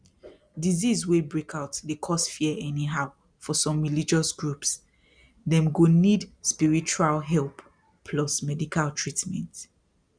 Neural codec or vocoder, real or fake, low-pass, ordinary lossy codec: none; real; 9.9 kHz; none